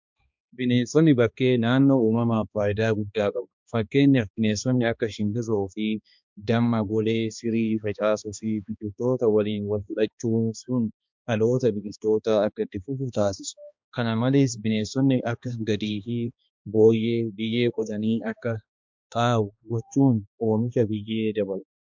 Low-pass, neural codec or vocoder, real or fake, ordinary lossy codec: 7.2 kHz; codec, 16 kHz, 2 kbps, X-Codec, HuBERT features, trained on balanced general audio; fake; MP3, 64 kbps